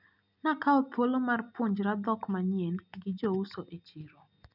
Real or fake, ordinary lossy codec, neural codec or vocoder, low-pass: real; none; none; 5.4 kHz